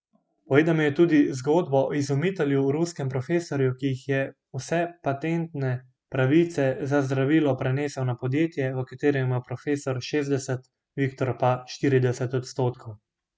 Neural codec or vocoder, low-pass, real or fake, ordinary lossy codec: none; none; real; none